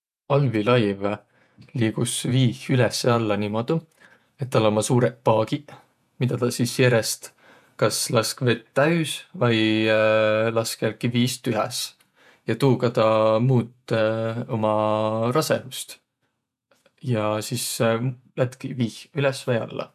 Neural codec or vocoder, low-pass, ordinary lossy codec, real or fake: vocoder, 48 kHz, 128 mel bands, Vocos; 14.4 kHz; none; fake